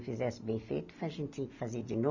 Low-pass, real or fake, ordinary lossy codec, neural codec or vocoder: 7.2 kHz; real; none; none